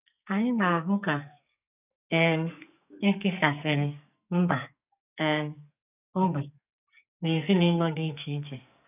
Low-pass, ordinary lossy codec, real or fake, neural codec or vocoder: 3.6 kHz; none; fake; codec, 44.1 kHz, 2.6 kbps, SNAC